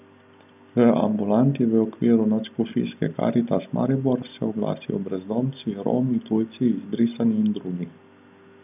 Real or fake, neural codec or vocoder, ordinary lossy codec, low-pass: real; none; none; 3.6 kHz